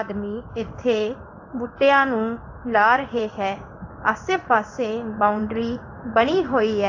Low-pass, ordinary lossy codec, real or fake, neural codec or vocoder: 7.2 kHz; AAC, 48 kbps; fake; codec, 16 kHz in and 24 kHz out, 1 kbps, XY-Tokenizer